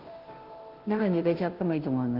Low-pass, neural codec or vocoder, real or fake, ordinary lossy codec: 5.4 kHz; codec, 16 kHz, 0.5 kbps, FunCodec, trained on Chinese and English, 25 frames a second; fake; Opus, 16 kbps